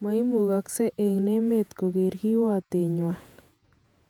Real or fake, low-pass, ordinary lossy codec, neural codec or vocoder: fake; 19.8 kHz; none; vocoder, 48 kHz, 128 mel bands, Vocos